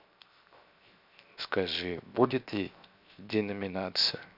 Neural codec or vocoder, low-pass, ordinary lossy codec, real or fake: codec, 16 kHz, 0.7 kbps, FocalCodec; 5.4 kHz; none; fake